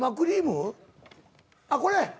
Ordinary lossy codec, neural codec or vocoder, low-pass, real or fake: none; none; none; real